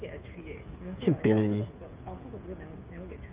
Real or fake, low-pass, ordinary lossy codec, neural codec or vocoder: fake; 3.6 kHz; Opus, 32 kbps; codec, 16 kHz, 8 kbps, FreqCodec, smaller model